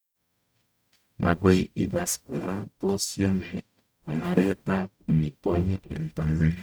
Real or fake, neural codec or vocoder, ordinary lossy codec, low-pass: fake; codec, 44.1 kHz, 0.9 kbps, DAC; none; none